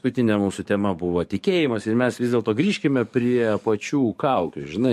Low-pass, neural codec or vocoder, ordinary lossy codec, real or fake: 14.4 kHz; codec, 44.1 kHz, 7.8 kbps, DAC; MP3, 64 kbps; fake